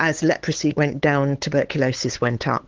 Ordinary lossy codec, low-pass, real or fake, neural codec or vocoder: Opus, 16 kbps; 7.2 kHz; fake; codec, 16 kHz, 8 kbps, FunCodec, trained on LibriTTS, 25 frames a second